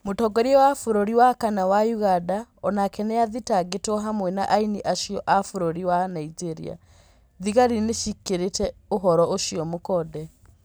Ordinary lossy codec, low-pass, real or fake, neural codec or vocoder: none; none; real; none